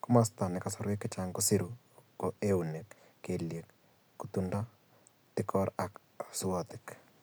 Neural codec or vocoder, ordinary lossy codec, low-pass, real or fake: none; none; none; real